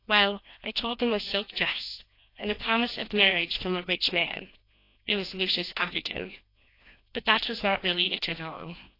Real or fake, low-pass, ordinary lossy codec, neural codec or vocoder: fake; 5.4 kHz; AAC, 32 kbps; codec, 16 kHz, 1 kbps, FreqCodec, larger model